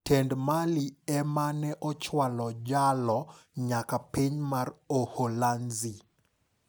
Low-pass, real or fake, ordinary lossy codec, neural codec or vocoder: none; real; none; none